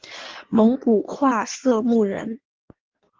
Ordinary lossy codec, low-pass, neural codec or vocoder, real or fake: Opus, 16 kbps; 7.2 kHz; codec, 16 kHz in and 24 kHz out, 1.1 kbps, FireRedTTS-2 codec; fake